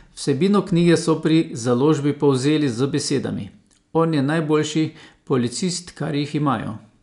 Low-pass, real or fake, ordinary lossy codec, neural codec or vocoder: 10.8 kHz; real; none; none